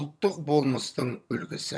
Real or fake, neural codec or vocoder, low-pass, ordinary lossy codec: fake; vocoder, 22.05 kHz, 80 mel bands, HiFi-GAN; none; none